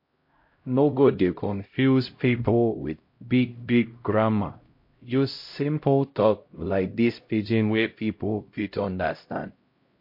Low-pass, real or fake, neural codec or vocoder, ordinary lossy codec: 5.4 kHz; fake; codec, 16 kHz, 0.5 kbps, X-Codec, HuBERT features, trained on LibriSpeech; MP3, 32 kbps